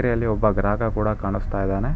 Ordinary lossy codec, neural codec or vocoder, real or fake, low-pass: none; none; real; none